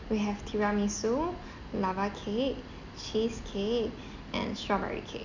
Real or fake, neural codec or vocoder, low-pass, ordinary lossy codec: real; none; 7.2 kHz; none